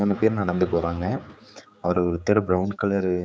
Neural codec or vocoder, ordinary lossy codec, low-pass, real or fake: codec, 16 kHz, 4 kbps, X-Codec, HuBERT features, trained on general audio; none; none; fake